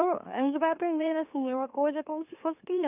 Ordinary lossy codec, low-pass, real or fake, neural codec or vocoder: none; 3.6 kHz; fake; autoencoder, 44.1 kHz, a latent of 192 numbers a frame, MeloTTS